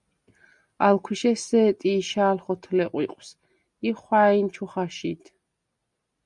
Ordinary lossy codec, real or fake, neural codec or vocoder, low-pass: Opus, 64 kbps; real; none; 10.8 kHz